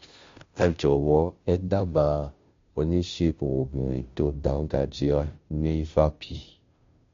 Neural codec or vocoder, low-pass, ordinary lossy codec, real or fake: codec, 16 kHz, 0.5 kbps, FunCodec, trained on Chinese and English, 25 frames a second; 7.2 kHz; MP3, 48 kbps; fake